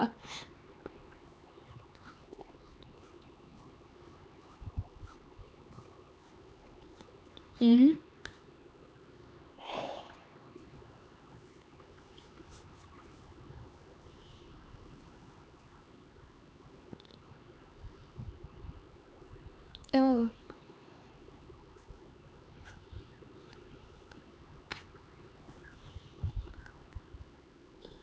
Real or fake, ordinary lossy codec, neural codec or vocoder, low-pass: fake; none; codec, 16 kHz, 4 kbps, X-Codec, HuBERT features, trained on LibriSpeech; none